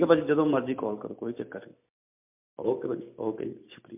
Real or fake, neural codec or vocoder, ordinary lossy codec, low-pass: real; none; MP3, 32 kbps; 3.6 kHz